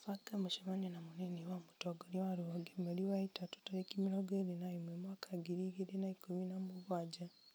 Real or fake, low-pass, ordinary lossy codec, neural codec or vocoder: real; none; none; none